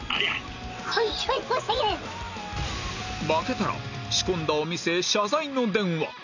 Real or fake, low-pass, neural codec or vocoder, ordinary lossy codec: real; 7.2 kHz; none; none